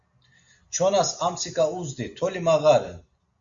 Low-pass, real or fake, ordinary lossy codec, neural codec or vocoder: 7.2 kHz; real; Opus, 64 kbps; none